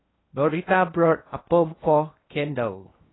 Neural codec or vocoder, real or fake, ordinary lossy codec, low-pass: codec, 16 kHz in and 24 kHz out, 0.8 kbps, FocalCodec, streaming, 65536 codes; fake; AAC, 16 kbps; 7.2 kHz